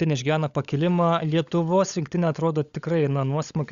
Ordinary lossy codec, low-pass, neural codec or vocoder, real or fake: Opus, 64 kbps; 7.2 kHz; codec, 16 kHz, 16 kbps, FunCodec, trained on LibriTTS, 50 frames a second; fake